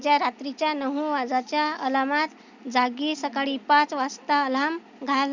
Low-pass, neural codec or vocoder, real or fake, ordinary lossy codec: 7.2 kHz; none; real; Opus, 64 kbps